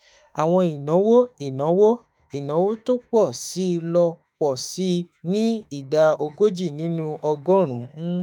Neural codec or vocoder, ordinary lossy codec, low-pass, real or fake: autoencoder, 48 kHz, 32 numbers a frame, DAC-VAE, trained on Japanese speech; none; 19.8 kHz; fake